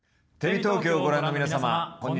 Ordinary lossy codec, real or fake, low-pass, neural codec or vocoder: none; real; none; none